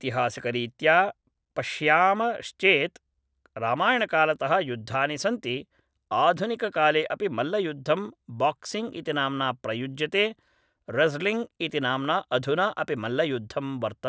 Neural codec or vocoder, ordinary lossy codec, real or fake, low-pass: none; none; real; none